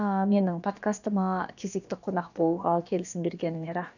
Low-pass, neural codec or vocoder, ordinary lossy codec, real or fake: 7.2 kHz; codec, 16 kHz, about 1 kbps, DyCAST, with the encoder's durations; none; fake